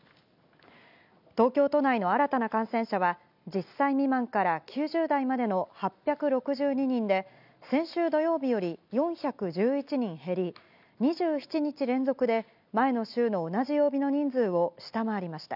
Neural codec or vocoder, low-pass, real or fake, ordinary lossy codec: none; 5.4 kHz; real; none